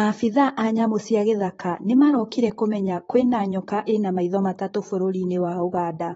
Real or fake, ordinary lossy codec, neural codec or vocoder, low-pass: fake; AAC, 24 kbps; vocoder, 44.1 kHz, 128 mel bands, Pupu-Vocoder; 19.8 kHz